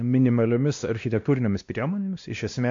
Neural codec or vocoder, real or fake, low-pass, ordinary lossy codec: codec, 16 kHz, 1 kbps, X-Codec, WavLM features, trained on Multilingual LibriSpeech; fake; 7.2 kHz; MP3, 48 kbps